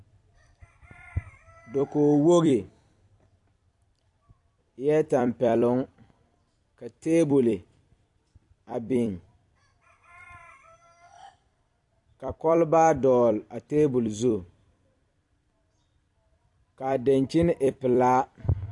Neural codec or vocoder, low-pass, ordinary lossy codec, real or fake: vocoder, 44.1 kHz, 128 mel bands every 256 samples, BigVGAN v2; 10.8 kHz; AAC, 64 kbps; fake